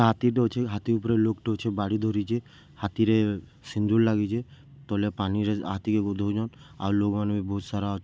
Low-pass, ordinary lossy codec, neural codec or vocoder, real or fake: none; none; none; real